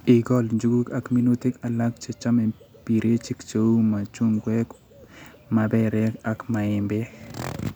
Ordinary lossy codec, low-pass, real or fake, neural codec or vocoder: none; none; real; none